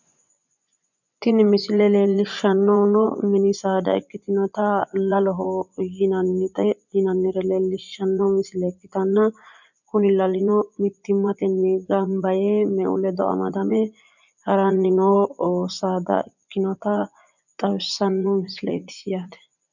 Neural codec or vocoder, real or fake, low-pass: vocoder, 24 kHz, 100 mel bands, Vocos; fake; 7.2 kHz